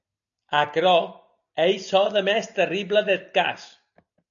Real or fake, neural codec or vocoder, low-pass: real; none; 7.2 kHz